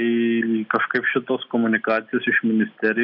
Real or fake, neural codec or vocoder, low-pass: real; none; 5.4 kHz